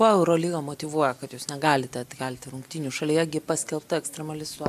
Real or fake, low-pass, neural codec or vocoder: real; 14.4 kHz; none